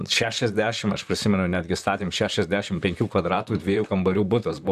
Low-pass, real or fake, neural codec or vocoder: 14.4 kHz; fake; vocoder, 44.1 kHz, 128 mel bands, Pupu-Vocoder